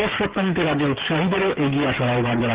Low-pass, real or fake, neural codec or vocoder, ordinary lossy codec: 3.6 kHz; fake; codec, 16 kHz, 16 kbps, FreqCodec, smaller model; Opus, 16 kbps